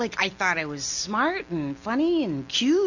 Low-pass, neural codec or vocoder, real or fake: 7.2 kHz; none; real